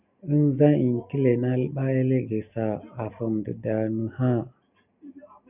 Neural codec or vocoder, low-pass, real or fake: none; 3.6 kHz; real